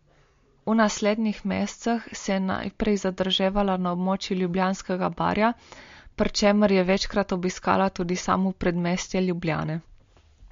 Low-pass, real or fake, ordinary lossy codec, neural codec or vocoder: 7.2 kHz; real; MP3, 48 kbps; none